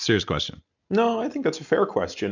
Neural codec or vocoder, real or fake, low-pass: none; real; 7.2 kHz